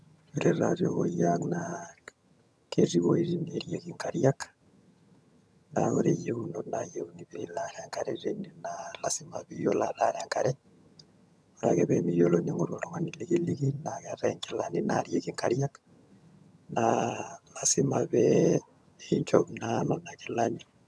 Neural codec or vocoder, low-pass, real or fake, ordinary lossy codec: vocoder, 22.05 kHz, 80 mel bands, HiFi-GAN; none; fake; none